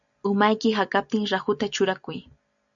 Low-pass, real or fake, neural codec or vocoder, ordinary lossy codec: 7.2 kHz; real; none; MP3, 96 kbps